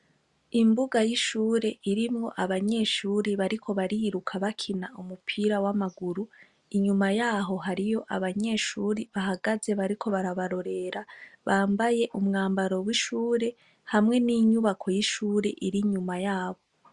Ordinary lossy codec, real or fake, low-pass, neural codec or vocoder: Opus, 64 kbps; real; 10.8 kHz; none